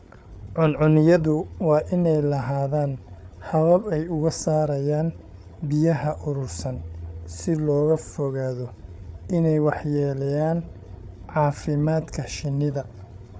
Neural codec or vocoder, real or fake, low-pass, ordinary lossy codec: codec, 16 kHz, 8 kbps, FreqCodec, larger model; fake; none; none